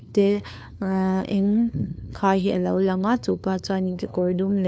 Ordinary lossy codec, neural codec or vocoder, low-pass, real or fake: none; codec, 16 kHz, 2 kbps, FunCodec, trained on LibriTTS, 25 frames a second; none; fake